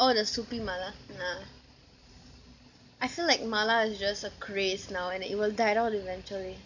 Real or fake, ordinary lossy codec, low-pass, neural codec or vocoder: real; none; 7.2 kHz; none